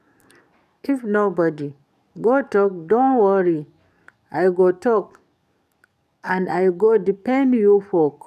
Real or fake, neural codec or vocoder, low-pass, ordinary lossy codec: fake; codec, 44.1 kHz, 7.8 kbps, DAC; 14.4 kHz; none